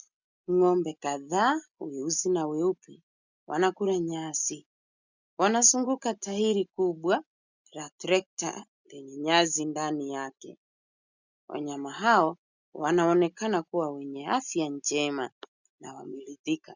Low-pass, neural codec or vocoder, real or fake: 7.2 kHz; none; real